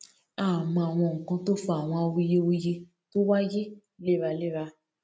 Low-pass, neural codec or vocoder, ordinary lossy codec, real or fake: none; none; none; real